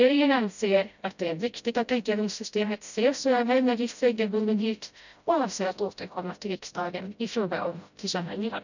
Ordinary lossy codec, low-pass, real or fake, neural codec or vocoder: none; 7.2 kHz; fake; codec, 16 kHz, 0.5 kbps, FreqCodec, smaller model